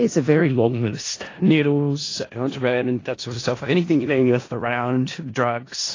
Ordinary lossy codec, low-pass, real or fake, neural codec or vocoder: AAC, 32 kbps; 7.2 kHz; fake; codec, 16 kHz in and 24 kHz out, 0.4 kbps, LongCat-Audio-Codec, four codebook decoder